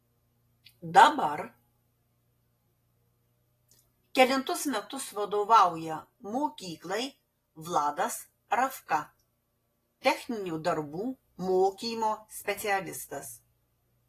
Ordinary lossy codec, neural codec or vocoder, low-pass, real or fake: AAC, 48 kbps; none; 14.4 kHz; real